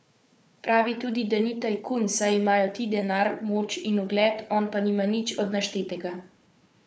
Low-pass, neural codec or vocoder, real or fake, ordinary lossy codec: none; codec, 16 kHz, 4 kbps, FunCodec, trained on Chinese and English, 50 frames a second; fake; none